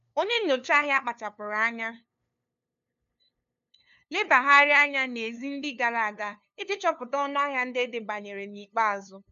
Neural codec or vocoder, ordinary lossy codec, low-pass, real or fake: codec, 16 kHz, 2 kbps, FunCodec, trained on LibriTTS, 25 frames a second; none; 7.2 kHz; fake